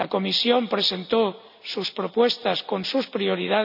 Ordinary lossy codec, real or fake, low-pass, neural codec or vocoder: none; real; 5.4 kHz; none